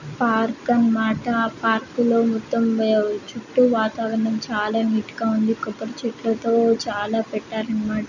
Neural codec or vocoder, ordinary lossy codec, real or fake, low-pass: none; none; real; 7.2 kHz